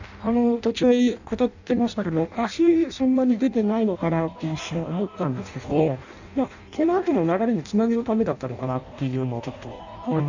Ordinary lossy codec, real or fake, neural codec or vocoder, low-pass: none; fake; codec, 16 kHz in and 24 kHz out, 0.6 kbps, FireRedTTS-2 codec; 7.2 kHz